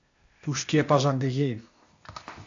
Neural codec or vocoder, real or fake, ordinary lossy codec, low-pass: codec, 16 kHz, 0.8 kbps, ZipCodec; fake; AAC, 64 kbps; 7.2 kHz